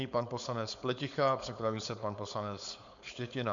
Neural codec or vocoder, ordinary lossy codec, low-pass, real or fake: codec, 16 kHz, 4.8 kbps, FACodec; MP3, 64 kbps; 7.2 kHz; fake